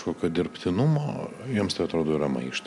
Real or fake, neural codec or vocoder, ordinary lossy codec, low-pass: real; none; MP3, 96 kbps; 10.8 kHz